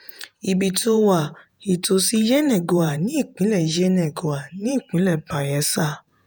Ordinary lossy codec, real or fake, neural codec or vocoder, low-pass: none; fake; vocoder, 48 kHz, 128 mel bands, Vocos; none